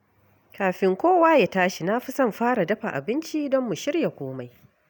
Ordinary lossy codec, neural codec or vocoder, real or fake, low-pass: none; none; real; none